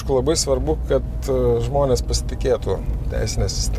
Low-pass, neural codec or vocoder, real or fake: 14.4 kHz; none; real